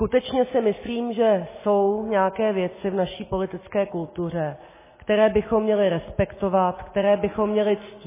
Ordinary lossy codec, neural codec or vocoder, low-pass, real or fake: MP3, 16 kbps; none; 3.6 kHz; real